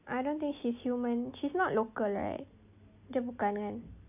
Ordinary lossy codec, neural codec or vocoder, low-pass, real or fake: AAC, 32 kbps; none; 3.6 kHz; real